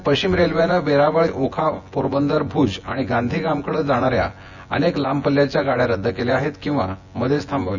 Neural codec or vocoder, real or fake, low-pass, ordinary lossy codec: vocoder, 24 kHz, 100 mel bands, Vocos; fake; 7.2 kHz; none